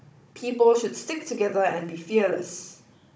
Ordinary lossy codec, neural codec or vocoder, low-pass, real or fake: none; codec, 16 kHz, 16 kbps, FunCodec, trained on Chinese and English, 50 frames a second; none; fake